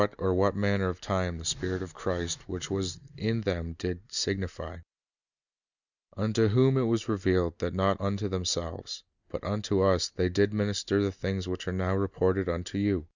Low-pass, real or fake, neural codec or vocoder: 7.2 kHz; real; none